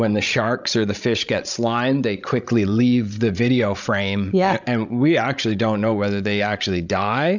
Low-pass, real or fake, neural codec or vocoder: 7.2 kHz; fake; codec, 16 kHz, 16 kbps, FreqCodec, larger model